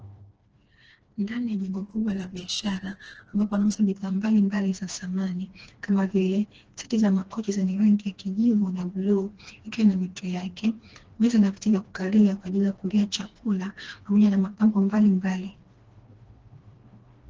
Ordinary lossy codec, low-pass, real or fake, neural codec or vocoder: Opus, 16 kbps; 7.2 kHz; fake; codec, 16 kHz, 2 kbps, FreqCodec, smaller model